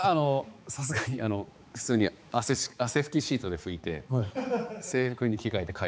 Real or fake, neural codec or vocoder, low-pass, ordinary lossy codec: fake; codec, 16 kHz, 4 kbps, X-Codec, HuBERT features, trained on balanced general audio; none; none